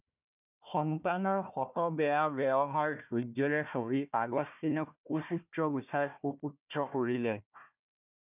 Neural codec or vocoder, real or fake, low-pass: codec, 16 kHz, 1 kbps, FunCodec, trained on Chinese and English, 50 frames a second; fake; 3.6 kHz